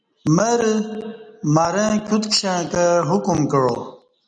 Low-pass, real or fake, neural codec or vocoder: 9.9 kHz; real; none